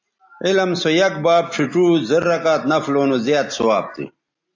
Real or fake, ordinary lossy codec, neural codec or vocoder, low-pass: real; AAC, 48 kbps; none; 7.2 kHz